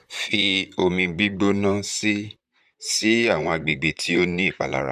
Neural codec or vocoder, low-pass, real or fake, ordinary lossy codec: vocoder, 44.1 kHz, 128 mel bands, Pupu-Vocoder; 14.4 kHz; fake; none